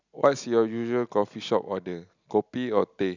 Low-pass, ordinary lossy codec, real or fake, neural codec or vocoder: 7.2 kHz; none; real; none